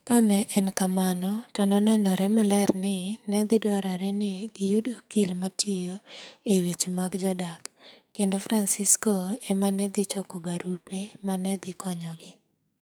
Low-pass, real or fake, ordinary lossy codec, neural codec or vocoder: none; fake; none; codec, 44.1 kHz, 2.6 kbps, SNAC